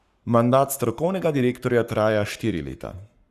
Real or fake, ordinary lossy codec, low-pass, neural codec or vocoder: fake; Opus, 64 kbps; 14.4 kHz; codec, 44.1 kHz, 7.8 kbps, Pupu-Codec